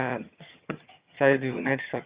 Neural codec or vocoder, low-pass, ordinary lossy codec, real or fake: vocoder, 22.05 kHz, 80 mel bands, HiFi-GAN; 3.6 kHz; Opus, 64 kbps; fake